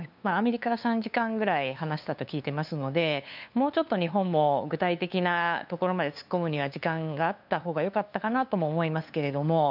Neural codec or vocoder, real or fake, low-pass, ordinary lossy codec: codec, 16 kHz, 2 kbps, FunCodec, trained on LibriTTS, 25 frames a second; fake; 5.4 kHz; none